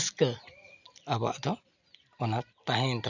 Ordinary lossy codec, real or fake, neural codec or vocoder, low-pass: none; real; none; 7.2 kHz